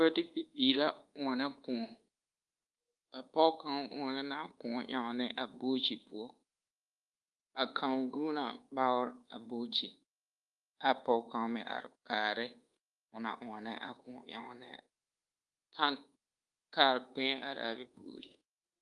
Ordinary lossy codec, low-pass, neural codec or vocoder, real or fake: Opus, 32 kbps; 10.8 kHz; codec, 24 kHz, 1.2 kbps, DualCodec; fake